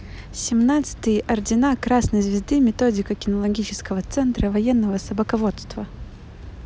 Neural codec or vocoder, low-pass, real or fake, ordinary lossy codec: none; none; real; none